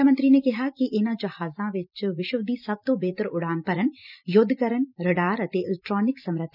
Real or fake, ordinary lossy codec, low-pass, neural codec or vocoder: fake; none; 5.4 kHz; vocoder, 44.1 kHz, 128 mel bands every 256 samples, BigVGAN v2